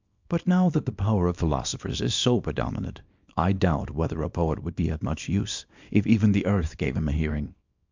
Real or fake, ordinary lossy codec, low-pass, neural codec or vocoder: fake; MP3, 64 kbps; 7.2 kHz; codec, 24 kHz, 0.9 kbps, WavTokenizer, small release